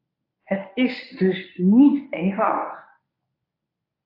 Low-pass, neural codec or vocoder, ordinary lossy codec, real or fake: 5.4 kHz; codec, 24 kHz, 0.9 kbps, WavTokenizer, medium speech release version 1; AAC, 32 kbps; fake